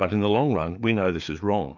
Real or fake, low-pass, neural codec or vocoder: fake; 7.2 kHz; codec, 16 kHz, 4 kbps, FreqCodec, larger model